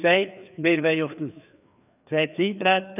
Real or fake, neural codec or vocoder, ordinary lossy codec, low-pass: fake; codec, 16 kHz, 2 kbps, FreqCodec, larger model; none; 3.6 kHz